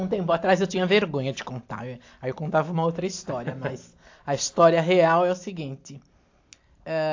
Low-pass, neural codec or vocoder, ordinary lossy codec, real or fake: 7.2 kHz; none; AAC, 48 kbps; real